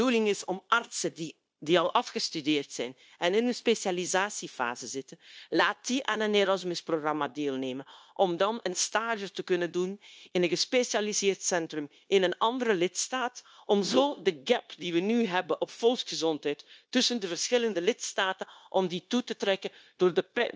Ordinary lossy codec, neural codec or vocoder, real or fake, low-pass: none; codec, 16 kHz, 0.9 kbps, LongCat-Audio-Codec; fake; none